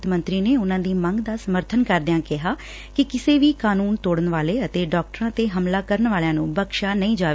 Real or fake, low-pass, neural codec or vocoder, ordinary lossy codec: real; none; none; none